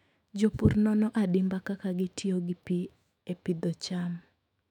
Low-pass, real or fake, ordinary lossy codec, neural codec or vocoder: 19.8 kHz; fake; none; autoencoder, 48 kHz, 128 numbers a frame, DAC-VAE, trained on Japanese speech